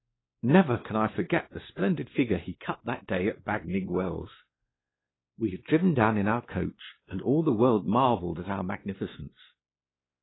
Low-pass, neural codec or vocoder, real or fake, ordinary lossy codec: 7.2 kHz; codec, 16 kHz, 2 kbps, X-Codec, WavLM features, trained on Multilingual LibriSpeech; fake; AAC, 16 kbps